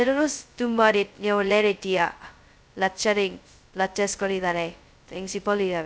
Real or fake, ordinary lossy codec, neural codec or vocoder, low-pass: fake; none; codec, 16 kHz, 0.2 kbps, FocalCodec; none